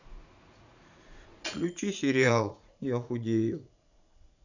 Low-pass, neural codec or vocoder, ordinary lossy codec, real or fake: 7.2 kHz; vocoder, 44.1 kHz, 80 mel bands, Vocos; none; fake